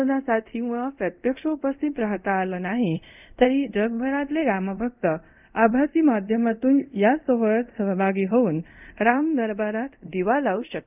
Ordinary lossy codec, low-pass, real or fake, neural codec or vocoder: none; 3.6 kHz; fake; codec, 24 kHz, 0.5 kbps, DualCodec